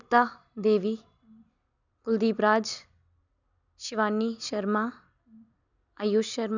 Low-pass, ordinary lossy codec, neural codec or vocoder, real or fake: 7.2 kHz; none; none; real